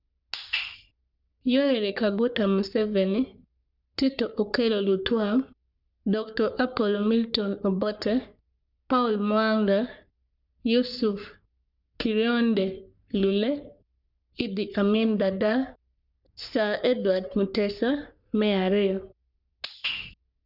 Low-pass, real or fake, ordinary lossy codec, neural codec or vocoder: 5.4 kHz; fake; none; codec, 44.1 kHz, 3.4 kbps, Pupu-Codec